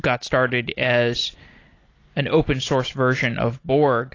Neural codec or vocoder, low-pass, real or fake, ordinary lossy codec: none; 7.2 kHz; real; AAC, 32 kbps